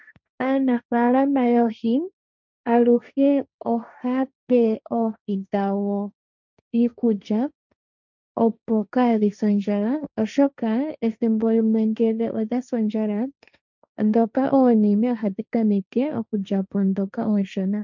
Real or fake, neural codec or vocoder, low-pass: fake; codec, 16 kHz, 1.1 kbps, Voila-Tokenizer; 7.2 kHz